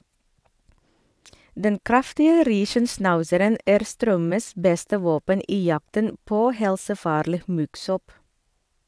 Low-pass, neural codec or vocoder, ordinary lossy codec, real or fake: none; vocoder, 22.05 kHz, 80 mel bands, WaveNeXt; none; fake